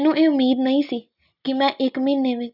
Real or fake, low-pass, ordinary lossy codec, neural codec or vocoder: real; 5.4 kHz; none; none